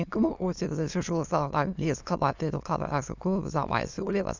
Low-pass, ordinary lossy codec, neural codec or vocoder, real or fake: 7.2 kHz; Opus, 64 kbps; autoencoder, 22.05 kHz, a latent of 192 numbers a frame, VITS, trained on many speakers; fake